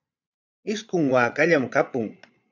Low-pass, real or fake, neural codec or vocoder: 7.2 kHz; fake; vocoder, 22.05 kHz, 80 mel bands, Vocos